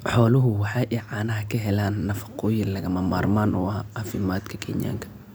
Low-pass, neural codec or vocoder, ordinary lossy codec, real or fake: none; none; none; real